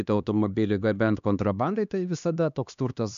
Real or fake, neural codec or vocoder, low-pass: fake; codec, 16 kHz, 2 kbps, X-Codec, HuBERT features, trained on LibriSpeech; 7.2 kHz